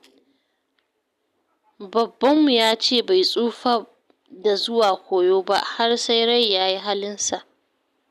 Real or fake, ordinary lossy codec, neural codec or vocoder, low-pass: real; none; none; 14.4 kHz